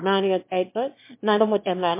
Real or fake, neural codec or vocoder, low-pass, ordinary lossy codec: fake; autoencoder, 22.05 kHz, a latent of 192 numbers a frame, VITS, trained on one speaker; 3.6 kHz; MP3, 24 kbps